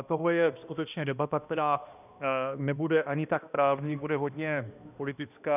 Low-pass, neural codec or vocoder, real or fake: 3.6 kHz; codec, 16 kHz, 1 kbps, X-Codec, HuBERT features, trained on balanced general audio; fake